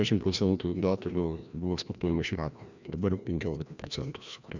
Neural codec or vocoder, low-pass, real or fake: codec, 16 kHz, 1 kbps, FreqCodec, larger model; 7.2 kHz; fake